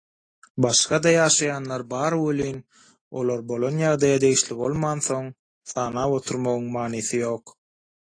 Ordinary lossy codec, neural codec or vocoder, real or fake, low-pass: AAC, 32 kbps; none; real; 9.9 kHz